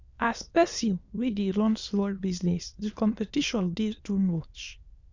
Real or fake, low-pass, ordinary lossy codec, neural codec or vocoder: fake; 7.2 kHz; Opus, 64 kbps; autoencoder, 22.05 kHz, a latent of 192 numbers a frame, VITS, trained on many speakers